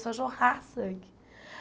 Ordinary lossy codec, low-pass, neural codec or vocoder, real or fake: none; none; none; real